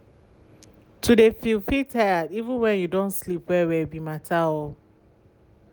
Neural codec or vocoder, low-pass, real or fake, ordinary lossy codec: none; none; real; none